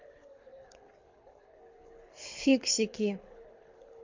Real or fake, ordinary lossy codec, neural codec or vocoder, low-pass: fake; MP3, 48 kbps; codec, 24 kHz, 6 kbps, HILCodec; 7.2 kHz